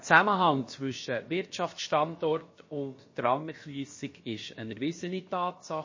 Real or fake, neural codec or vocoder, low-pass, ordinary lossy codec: fake; codec, 16 kHz, about 1 kbps, DyCAST, with the encoder's durations; 7.2 kHz; MP3, 32 kbps